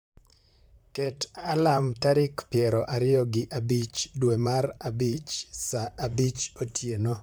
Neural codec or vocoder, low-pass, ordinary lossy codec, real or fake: vocoder, 44.1 kHz, 128 mel bands, Pupu-Vocoder; none; none; fake